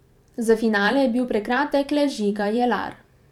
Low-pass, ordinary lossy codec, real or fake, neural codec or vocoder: 19.8 kHz; none; fake; vocoder, 44.1 kHz, 128 mel bands every 512 samples, BigVGAN v2